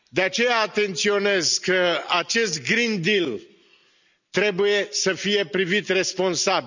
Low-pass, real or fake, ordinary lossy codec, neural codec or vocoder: 7.2 kHz; real; none; none